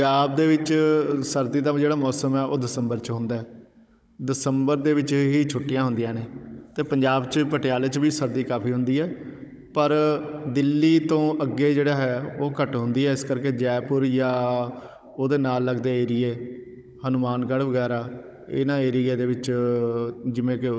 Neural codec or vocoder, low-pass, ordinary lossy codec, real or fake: codec, 16 kHz, 16 kbps, FunCodec, trained on Chinese and English, 50 frames a second; none; none; fake